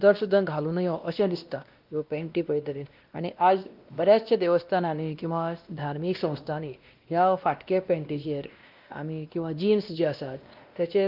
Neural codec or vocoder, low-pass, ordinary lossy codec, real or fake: codec, 16 kHz, 1 kbps, X-Codec, WavLM features, trained on Multilingual LibriSpeech; 5.4 kHz; Opus, 32 kbps; fake